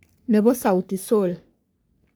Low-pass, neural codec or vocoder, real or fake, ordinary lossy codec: none; codec, 44.1 kHz, 3.4 kbps, Pupu-Codec; fake; none